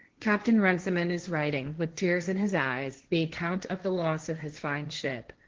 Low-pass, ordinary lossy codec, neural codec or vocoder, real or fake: 7.2 kHz; Opus, 16 kbps; codec, 16 kHz, 1.1 kbps, Voila-Tokenizer; fake